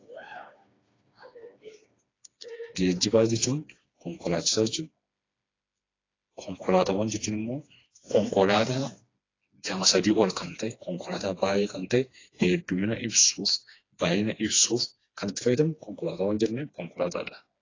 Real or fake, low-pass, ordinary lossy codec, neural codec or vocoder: fake; 7.2 kHz; AAC, 32 kbps; codec, 16 kHz, 2 kbps, FreqCodec, smaller model